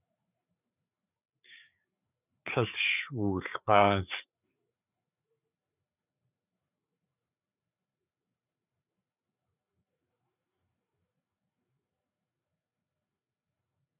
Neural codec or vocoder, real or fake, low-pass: codec, 16 kHz, 4 kbps, FreqCodec, larger model; fake; 3.6 kHz